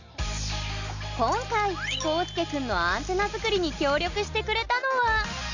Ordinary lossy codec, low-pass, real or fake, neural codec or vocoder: none; 7.2 kHz; real; none